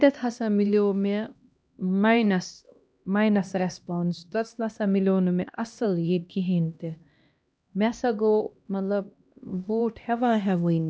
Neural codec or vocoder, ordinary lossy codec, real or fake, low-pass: codec, 16 kHz, 1 kbps, X-Codec, HuBERT features, trained on LibriSpeech; none; fake; none